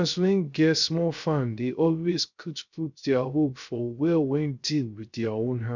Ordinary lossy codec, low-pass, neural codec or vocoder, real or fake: none; 7.2 kHz; codec, 16 kHz, 0.3 kbps, FocalCodec; fake